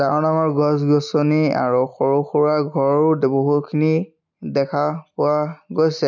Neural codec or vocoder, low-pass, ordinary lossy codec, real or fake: none; 7.2 kHz; none; real